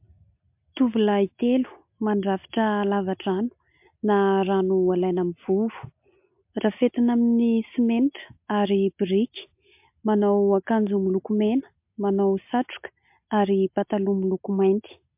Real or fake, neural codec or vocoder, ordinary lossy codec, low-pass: real; none; MP3, 32 kbps; 3.6 kHz